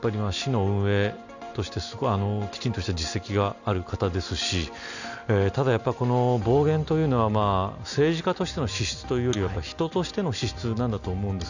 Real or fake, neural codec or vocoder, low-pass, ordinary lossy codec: real; none; 7.2 kHz; none